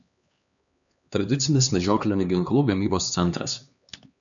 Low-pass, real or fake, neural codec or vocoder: 7.2 kHz; fake; codec, 16 kHz, 2 kbps, X-Codec, HuBERT features, trained on LibriSpeech